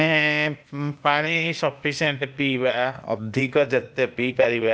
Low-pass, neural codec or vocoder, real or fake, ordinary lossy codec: none; codec, 16 kHz, 0.8 kbps, ZipCodec; fake; none